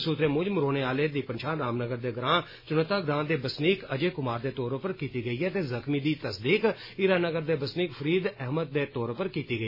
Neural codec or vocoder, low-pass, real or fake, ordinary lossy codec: none; 5.4 kHz; real; MP3, 24 kbps